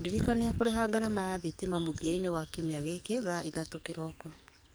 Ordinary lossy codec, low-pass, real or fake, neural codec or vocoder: none; none; fake; codec, 44.1 kHz, 2.6 kbps, SNAC